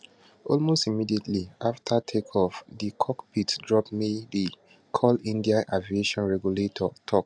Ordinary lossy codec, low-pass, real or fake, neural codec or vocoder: none; none; real; none